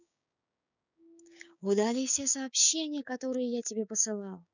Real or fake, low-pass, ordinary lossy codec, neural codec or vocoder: fake; 7.2 kHz; none; codec, 16 kHz, 6 kbps, DAC